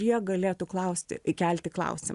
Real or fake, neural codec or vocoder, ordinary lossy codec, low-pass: real; none; Opus, 64 kbps; 10.8 kHz